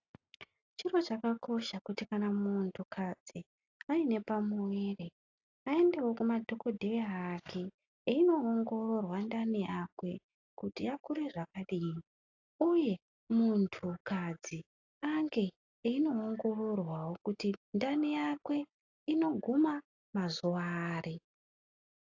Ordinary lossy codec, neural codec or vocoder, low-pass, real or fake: AAC, 48 kbps; none; 7.2 kHz; real